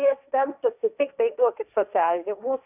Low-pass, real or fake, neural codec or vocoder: 3.6 kHz; fake; codec, 16 kHz, 1.1 kbps, Voila-Tokenizer